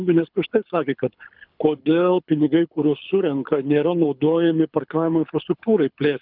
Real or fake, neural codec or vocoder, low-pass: fake; codec, 24 kHz, 6 kbps, HILCodec; 5.4 kHz